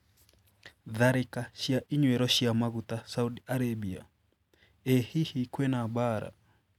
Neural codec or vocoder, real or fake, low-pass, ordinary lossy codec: none; real; 19.8 kHz; none